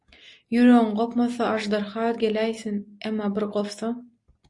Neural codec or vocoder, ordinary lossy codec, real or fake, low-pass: none; AAC, 64 kbps; real; 10.8 kHz